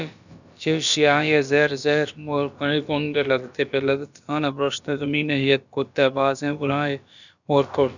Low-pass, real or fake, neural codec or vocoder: 7.2 kHz; fake; codec, 16 kHz, about 1 kbps, DyCAST, with the encoder's durations